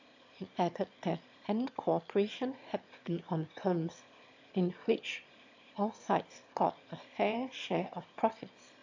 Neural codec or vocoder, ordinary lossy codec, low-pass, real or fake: autoencoder, 22.05 kHz, a latent of 192 numbers a frame, VITS, trained on one speaker; AAC, 48 kbps; 7.2 kHz; fake